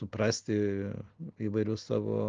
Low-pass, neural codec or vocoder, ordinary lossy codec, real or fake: 7.2 kHz; none; Opus, 16 kbps; real